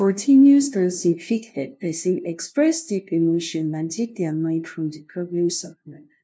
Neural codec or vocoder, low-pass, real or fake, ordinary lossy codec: codec, 16 kHz, 0.5 kbps, FunCodec, trained on LibriTTS, 25 frames a second; none; fake; none